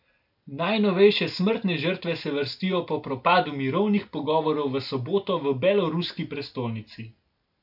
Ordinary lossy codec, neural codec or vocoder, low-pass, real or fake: MP3, 48 kbps; none; 5.4 kHz; real